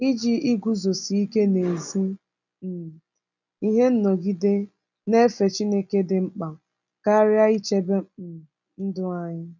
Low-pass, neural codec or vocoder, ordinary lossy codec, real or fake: 7.2 kHz; none; none; real